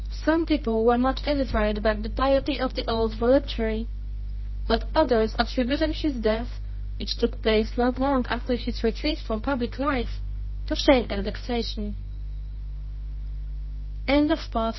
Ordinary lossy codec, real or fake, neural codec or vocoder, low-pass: MP3, 24 kbps; fake; codec, 24 kHz, 0.9 kbps, WavTokenizer, medium music audio release; 7.2 kHz